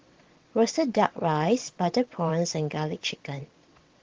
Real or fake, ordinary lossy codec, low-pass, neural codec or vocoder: fake; Opus, 16 kbps; 7.2 kHz; vocoder, 22.05 kHz, 80 mel bands, WaveNeXt